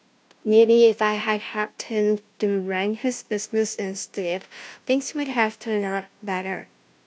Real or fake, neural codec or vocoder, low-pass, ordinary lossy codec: fake; codec, 16 kHz, 0.5 kbps, FunCodec, trained on Chinese and English, 25 frames a second; none; none